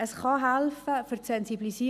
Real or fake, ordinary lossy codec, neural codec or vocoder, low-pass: real; none; none; 14.4 kHz